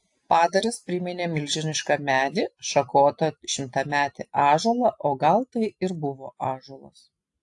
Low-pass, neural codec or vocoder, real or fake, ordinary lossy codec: 10.8 kHz; none; real; AAC, 64 kbps